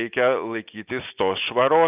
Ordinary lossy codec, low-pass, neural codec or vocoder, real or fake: Opus, 32 kbps; 3.6 kHz; none; real